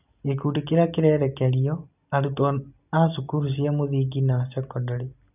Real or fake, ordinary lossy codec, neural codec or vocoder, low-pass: real; none; none; 3.6 kHz